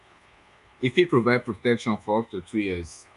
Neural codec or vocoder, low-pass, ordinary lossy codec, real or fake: codec, 24 kHz, 1.2 kbps, DualCodec; 10.8 kHz; none; fake